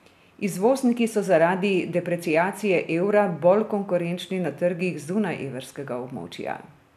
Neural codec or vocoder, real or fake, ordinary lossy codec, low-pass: none; real; none; 14.4 kHz